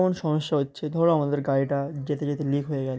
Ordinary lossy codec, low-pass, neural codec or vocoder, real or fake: none; none; none; real